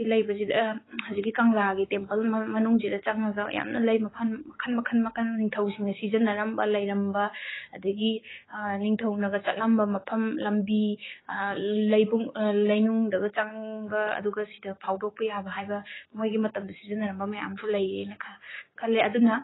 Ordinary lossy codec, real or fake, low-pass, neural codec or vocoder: AAC, 16 kbps; fake; 7.2 kHz; codec, 16 kHz, 6 kbps, DAC